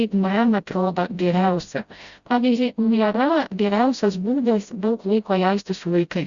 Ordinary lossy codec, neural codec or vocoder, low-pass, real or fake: Opus, 64 kbps; codec, 16 kHz, 0.5 kbps, FreqCodec, smaller model; 7.2 kHz; fake